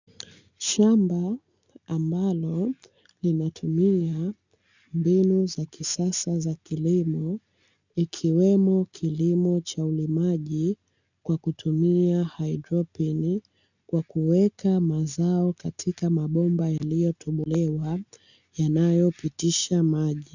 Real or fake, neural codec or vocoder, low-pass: real; none; 7.2 kHz